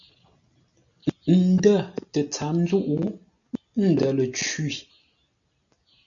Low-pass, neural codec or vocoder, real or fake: 7.2 kHz; none; real